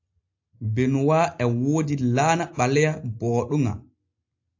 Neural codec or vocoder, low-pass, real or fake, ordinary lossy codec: none; 7.2 kHz; real; AAC, 48 kbps